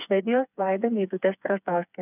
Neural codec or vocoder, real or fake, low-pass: codec, 16 kHz, 4 kbps, FreqCodec, smaller model; fake; 3.6 kHz